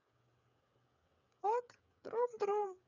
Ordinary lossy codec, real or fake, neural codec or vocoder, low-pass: none; fake; codec, 16 kHz, 8 kbps, FreqCodec, smaller model; 7.2 kHz